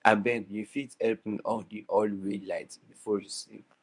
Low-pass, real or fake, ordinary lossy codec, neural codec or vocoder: 10.8 kHz; fake; none; codec, 24 kHz, 0.9 kbps, WavTokenizer, medium speech release version 1